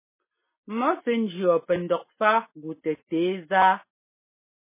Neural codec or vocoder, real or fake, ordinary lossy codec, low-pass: none; real; MP3, 16 kbps; 3.6 kHz